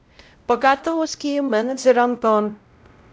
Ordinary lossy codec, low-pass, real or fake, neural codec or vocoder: none; none; fake; codec, 16 kHz, 0.5 kbps, X-Codec, WavLM features, trained on Multilingual LibriSpeech